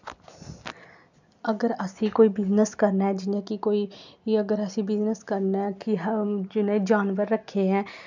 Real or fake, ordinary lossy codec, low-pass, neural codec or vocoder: real; none; 7.2 kHz; none